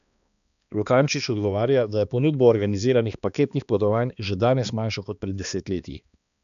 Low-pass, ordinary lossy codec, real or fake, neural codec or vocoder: 7.2 kHz; none; fake; codec, 16 kHz, 2 kbps, X-Codec, HuBERT features, trained on balanced general audio